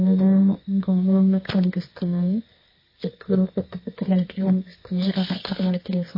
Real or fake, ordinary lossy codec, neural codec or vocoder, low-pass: fake; MP3, 24 kbps; codec, 24 kHz, 0.9 kbps, WavTokenizer, medium music audio release; 5.4 kHz